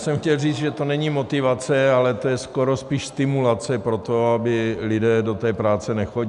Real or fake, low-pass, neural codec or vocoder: real; 10.8 kHz; none